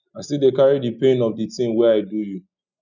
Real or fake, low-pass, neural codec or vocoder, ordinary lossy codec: real; 7.2 kHz; none; none